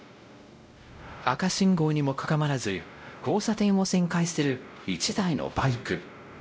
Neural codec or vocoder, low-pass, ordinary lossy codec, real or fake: codec, 16 kHz, 0.5 kbps, X-Codec, WavLM features, trained on Multilingual LibriSpeech; none; none; fake